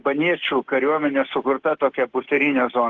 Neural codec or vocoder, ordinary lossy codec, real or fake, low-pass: none; Opus, 16 kbps; real; 7.2 kHz